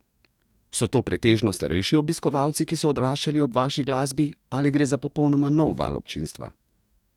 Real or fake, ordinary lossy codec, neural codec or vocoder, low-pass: fake; none; codec, 44.1 kHz, 2.6 kbps, DAC; 19.8 kHz